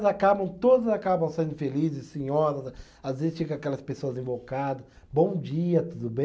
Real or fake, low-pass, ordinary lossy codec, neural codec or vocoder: real; none; none; none